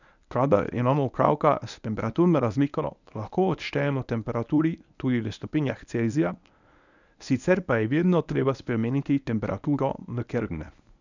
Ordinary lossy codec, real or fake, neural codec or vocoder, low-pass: none; fake; codec, 24 kHz, 0.9 kbps, WavTokenizer, medium speech release version 1; 7.2 kHz